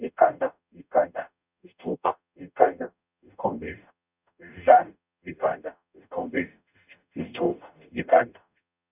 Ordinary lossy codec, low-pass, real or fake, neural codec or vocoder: none; 3.6 kHz; fake; codec, 44.1 kHz, 0.9 kbps, DAC